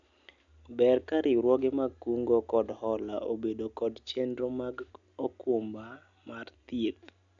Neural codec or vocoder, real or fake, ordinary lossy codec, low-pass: none; real; none; 7.2 kHz